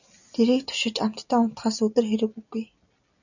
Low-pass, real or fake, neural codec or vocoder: 7.2 kHz; real; none